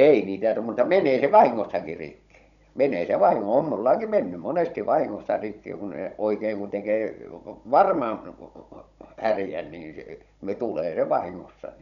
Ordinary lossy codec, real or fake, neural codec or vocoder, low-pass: none; fake; codec, 16 kHz, 16 kbps, FunCodec, trained on Chinese and English, 50 frames a second; 7.2 kHz